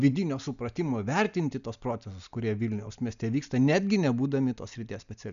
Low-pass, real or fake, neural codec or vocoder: 7.2 kHz; real; none